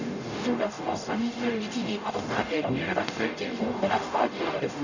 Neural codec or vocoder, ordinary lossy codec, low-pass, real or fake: codec, 44.1 kHz, 0.9 kbps, DAC; none; 7.2 kHz; fake